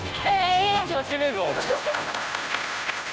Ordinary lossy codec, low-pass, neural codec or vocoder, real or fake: none; none; codec, 16 kHz, 0.5 kbps, FunCodec, trained on Chinese and English, 25 frames a second; fake